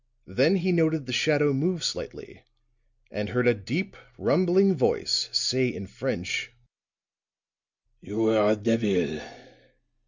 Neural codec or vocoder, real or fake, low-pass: none; real; 7.2 kHz